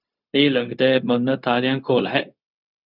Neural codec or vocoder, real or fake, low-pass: codec, 16 kHz, 0.4 kbps, LongCat-Audio-Codec; fake; 5.4 kHz